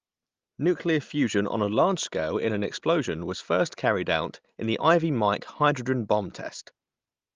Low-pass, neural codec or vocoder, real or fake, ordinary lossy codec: 7.2 kHz; none; real; Opus, 32 kbps